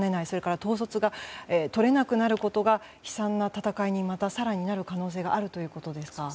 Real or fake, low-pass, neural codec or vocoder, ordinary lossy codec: real; none; none; none